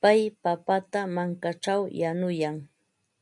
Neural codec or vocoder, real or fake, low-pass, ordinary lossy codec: none; real; 10.8 kHz; MP3, 96 kbps